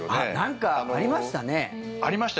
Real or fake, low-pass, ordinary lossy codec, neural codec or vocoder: real; none; none; none